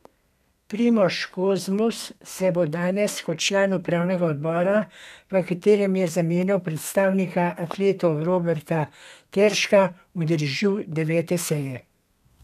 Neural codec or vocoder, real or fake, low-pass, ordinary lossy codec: codec, 32 kHz, 1.9 kbps, SNAC; fake; 14.4 kHz; none